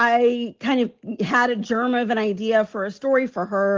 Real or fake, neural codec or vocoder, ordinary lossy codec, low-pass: real; none; Opus, 16 kbps; 7.2 kHz